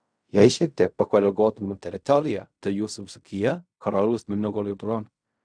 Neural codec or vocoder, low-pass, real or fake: codec, 16 kHz in and 24 kHz out, 0.4 kbps, LongCat-Audio-Codec, fine tuned four codebook decoder; 9.9 kHz; fake